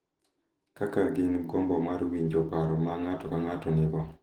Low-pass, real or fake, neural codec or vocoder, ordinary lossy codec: 19.8 kHz; fake; autoencoder, 48 kHz, 128 numbers a frame, DAC-VAE, trained on Japanese speech; Opus, 16 kbps